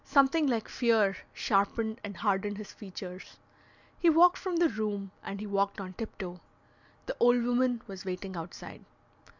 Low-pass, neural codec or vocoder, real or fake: 7.2 kHz; none; real